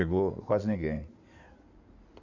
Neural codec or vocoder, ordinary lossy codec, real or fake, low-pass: codec, 16 kHz in and 24 kHz out, 2.2 kbps, FireRedTTS-2 codec; none; fake; 7.2 kHz